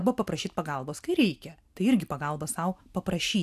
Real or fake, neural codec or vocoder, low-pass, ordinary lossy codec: real; none; 14.4 kHz; AAC, 96 kbps